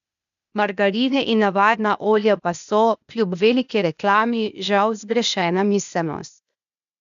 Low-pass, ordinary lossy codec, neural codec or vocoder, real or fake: 7.2 kHz; none; codec, 16 kHz, 0.8 kbps, ZipCodec; fake